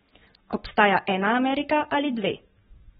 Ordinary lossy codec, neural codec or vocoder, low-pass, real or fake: AAC, 16 kbps; codec, 44.1 kHz, 7.8 kbps, Pupu-Codec; 19.8 kHz; fake